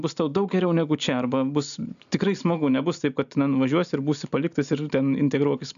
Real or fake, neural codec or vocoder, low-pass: real; none; 7.2 kHz